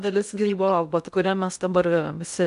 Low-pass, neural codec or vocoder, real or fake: 10.8 kHz; codec, 16 kHz in and 24 kHz out, 0.6 kbps, FocalCodec, streaming, 2048 codes; fake